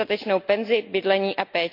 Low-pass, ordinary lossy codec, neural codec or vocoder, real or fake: 5.4 kHz; MP3, 48 kbps; none; real